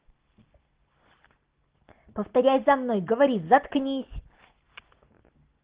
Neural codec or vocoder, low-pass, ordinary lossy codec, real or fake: none; 3.6 kHz; Opus, 16 kbps; real